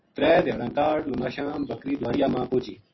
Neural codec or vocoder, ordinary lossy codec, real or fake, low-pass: none; MP3, 24 kbps; real; 7.2 kHz